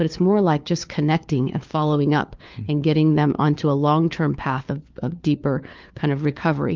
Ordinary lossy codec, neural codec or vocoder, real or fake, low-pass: Opus, 24 kbps; codec, 16 kHz, 4 kbps, FunCodec, trained on LibriTTS, 50 frames a second; fake; 7.2 kHz